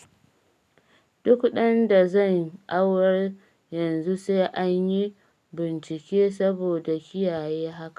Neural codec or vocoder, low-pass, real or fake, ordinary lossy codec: none; 14.4 kHz; real; none